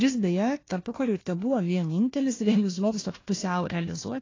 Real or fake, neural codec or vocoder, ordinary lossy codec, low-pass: fake; codec, 16 kHz, 1 kbps, FunCodec, trained on Chinese and English, 50 frames a second; AAC, 32 kbps; 7.2 kHz